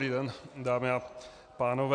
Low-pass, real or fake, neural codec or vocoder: 9.9 kHz; real; none